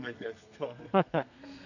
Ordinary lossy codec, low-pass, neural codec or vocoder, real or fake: none; 7.2 kHz; codec, 32 kHz, 1.9 kbps, SNAC; fake